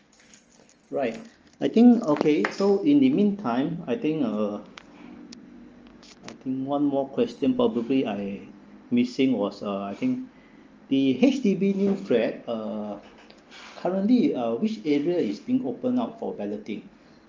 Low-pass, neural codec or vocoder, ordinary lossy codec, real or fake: 7.2 kHz; none; Opus, 24 kbps; real